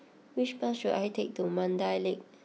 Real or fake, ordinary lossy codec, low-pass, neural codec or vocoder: real; none; none; none